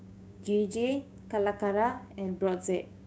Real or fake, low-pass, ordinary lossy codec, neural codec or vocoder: fake; none; none; codec, 16 kHz, 6 kbps, DAC